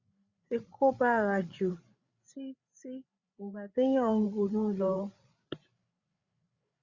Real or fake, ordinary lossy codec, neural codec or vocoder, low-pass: fake; Opus, 64 kbps; codec, 16 kHz, 8 kbps, FreqCodec, larger model; 7.2 kHz